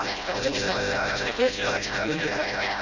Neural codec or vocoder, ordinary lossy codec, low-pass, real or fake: codec, 16 kHz, 0.5 kbps, FreqCodec, smaller model; none; 7.2 kHz; fake